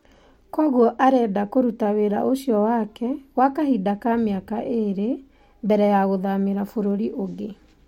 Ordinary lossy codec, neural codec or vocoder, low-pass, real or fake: MP3, 64 kbps; none; 19.8 kHz; real